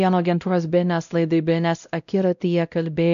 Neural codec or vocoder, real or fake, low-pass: codec, 16 kHz, 0.5 kbps, X-Codec, WavLM features, trained on Multilingual LibriSpeech; fake; 7.2 kHz